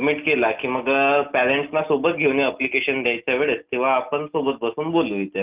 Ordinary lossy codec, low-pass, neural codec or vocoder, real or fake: Opus, 24 kbps; 3.6 kHz; none; real